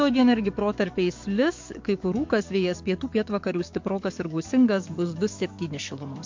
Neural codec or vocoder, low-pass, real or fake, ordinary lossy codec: codec, 44.1 kHz, 7.8 kbps, DAC; 7.2 kHz; fake; MP3, 48 kbps